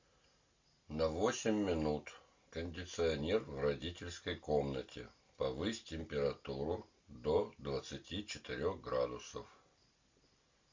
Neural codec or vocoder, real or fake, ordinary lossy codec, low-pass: none; real; MP3, 48 kbps; 7.2 kHz